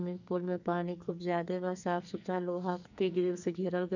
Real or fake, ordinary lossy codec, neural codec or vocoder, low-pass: fake; none; codec, 44.1 kHz, 2.6 kbps, SNAC; 7.2 kHz